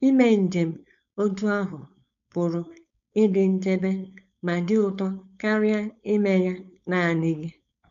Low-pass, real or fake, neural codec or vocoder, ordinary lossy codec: 7.2 kHz; fake; codec, 16 kHz, 4.8 kbps, FACodec; none